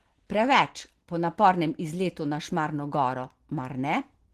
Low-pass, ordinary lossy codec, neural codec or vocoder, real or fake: 14.4 kHz; Opus, 16 kbps; none; real